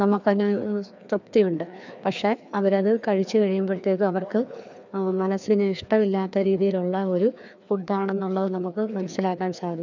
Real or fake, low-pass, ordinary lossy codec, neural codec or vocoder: fake; 7.2 kHz; none; codec, 16 kHz, 2 kbps, FreqCodec, larger model